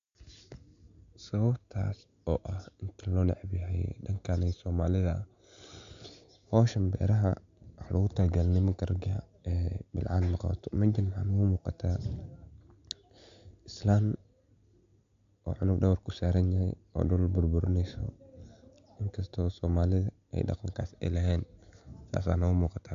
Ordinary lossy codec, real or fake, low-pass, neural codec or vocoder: AAC, 64 kbps; real; 7.2 kHz; none